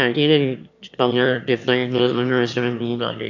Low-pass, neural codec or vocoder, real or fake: 7.2 kHz; autoencoder, 22.05 kHz, a latent of 192 numbers a frame, VITS, trained on one speaker; fake